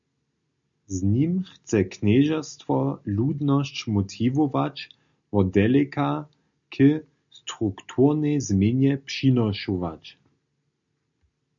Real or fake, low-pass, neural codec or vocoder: real; 7.2 kHz; none